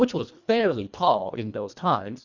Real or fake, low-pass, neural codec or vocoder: fake; 7.2 kHz; codec, 24 kHz, 1.5 kbps, HILCodec